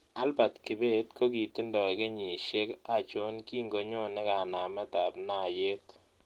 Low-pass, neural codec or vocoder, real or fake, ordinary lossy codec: 19.8 kHz; none; real; Opus, 16 kbps